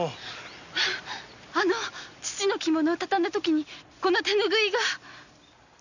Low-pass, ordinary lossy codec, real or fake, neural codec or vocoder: 7.2 kHz; none; real; none